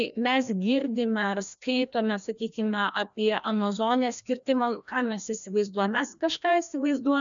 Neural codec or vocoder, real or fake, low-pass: codec, 16 kHz, 1 kbps, FreqCodec, larger model; fake; 7.2 kHz